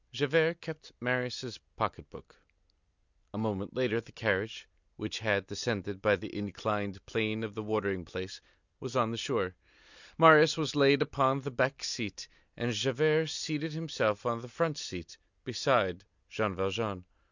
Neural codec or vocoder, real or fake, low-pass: none; real; 7.2 kHz